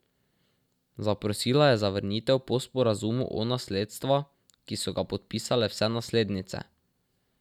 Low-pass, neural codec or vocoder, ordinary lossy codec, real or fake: 19.8 kHz; none; none; real